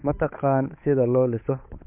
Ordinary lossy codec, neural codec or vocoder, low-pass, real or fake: MP3, 32 kbps; codec, 16 kHz, 8 kbps, FunCodec, trained on Chinese and English, 25 frames a second; 3.6 kHz; fake